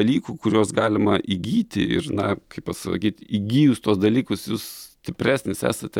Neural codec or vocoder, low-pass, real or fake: none; 19.8 kHz; real